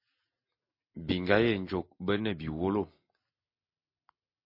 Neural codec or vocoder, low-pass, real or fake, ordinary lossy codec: none; 5.4 kHz; real; MP3, 32 kbps